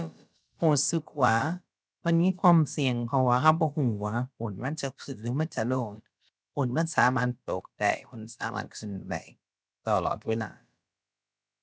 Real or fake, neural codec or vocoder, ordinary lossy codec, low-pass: fake; codec, 16 kHz, about 1 kbps, DyCAST, with the encoder's durations; none; none